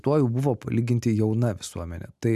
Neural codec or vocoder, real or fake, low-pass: none; real; 14.4 kHz